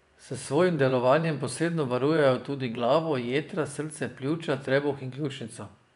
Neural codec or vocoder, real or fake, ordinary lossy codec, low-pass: vocoder, 24 kHz, 100 mel bands, Vocos; fake; none; 10.8 kHz